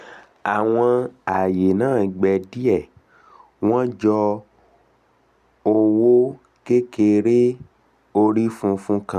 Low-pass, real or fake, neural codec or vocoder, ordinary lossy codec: 14.4 kHz; real; none; none